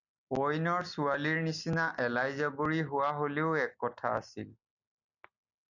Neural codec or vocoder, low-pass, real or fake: none; 7.2 kHz; real